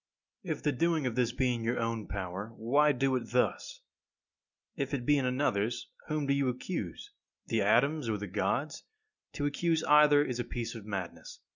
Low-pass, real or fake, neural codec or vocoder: 7.2 kHz; real; none